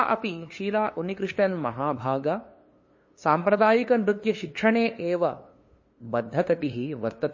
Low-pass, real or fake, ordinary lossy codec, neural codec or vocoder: 7.2 kHz; fake; MP3, 32 kbps; codec, 16 kHz, 2 kbps, FunCodec, trained on LibriTTS, 25 frames a second